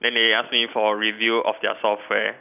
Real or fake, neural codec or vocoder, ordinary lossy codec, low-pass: real; none; none; 3.6 kHz